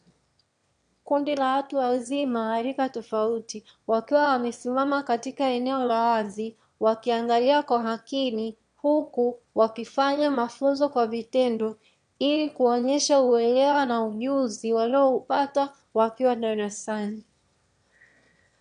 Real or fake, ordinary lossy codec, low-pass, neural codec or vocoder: fake; MP3, 64 kbps; 9.9 kHz; autoencoder, 22.05 kHz, a latent of 192 numbers a frame, VITS, trained on one speaker